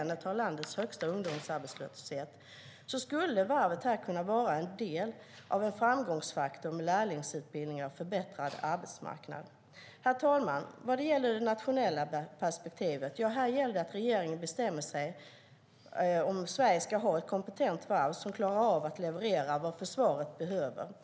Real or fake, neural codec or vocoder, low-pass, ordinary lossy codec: real; none; none; none